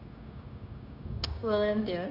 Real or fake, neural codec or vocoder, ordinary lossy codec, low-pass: fake; codec, 16 kHz, 0.9 kbps, LongCat-Audio-Codec; AAC, 32 kbps; 5.4 kHz